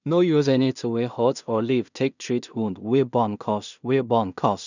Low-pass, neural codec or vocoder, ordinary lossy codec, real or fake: 7.2 kHz; codec, 16 kHz in and 24 kHz out, 0.4 kbps, LongCat-Audio-Codec, two codebook decoder; none; fake